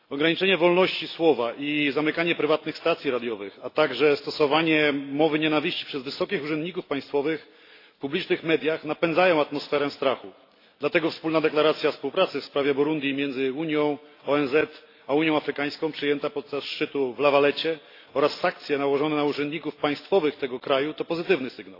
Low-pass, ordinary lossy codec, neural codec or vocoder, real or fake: 5.4 kHz; AAC, 32 kbps; none; real